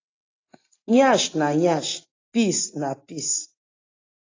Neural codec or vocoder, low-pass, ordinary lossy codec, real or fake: none; 7.2 kHz; AAC, 32 kbps; real